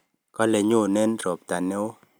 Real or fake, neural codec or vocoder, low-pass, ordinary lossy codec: real; none; none; none